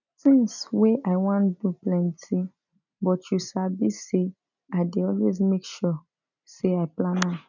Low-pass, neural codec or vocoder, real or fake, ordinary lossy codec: 7.2 kHz; none; real; none